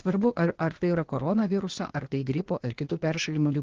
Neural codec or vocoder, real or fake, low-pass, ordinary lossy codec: codec, 16 kHz, 0.8 kbps, ZipCodec; fake; 7.2 kHz; Opus, 16 kbps